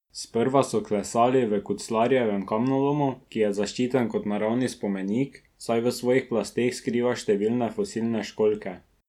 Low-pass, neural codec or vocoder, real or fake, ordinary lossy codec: 19.8 kHz; none; real; none